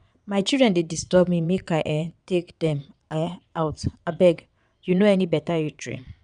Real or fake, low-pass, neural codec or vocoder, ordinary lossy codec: fake; 9.9 kHz; vocoder, 22.05 kHz, 80 mel bands, WaveNeXt; none